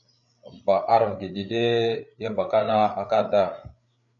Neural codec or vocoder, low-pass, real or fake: codec, 16 kHz, 8 kbps, FreqCodec, larger model; 7.2 kHz; fake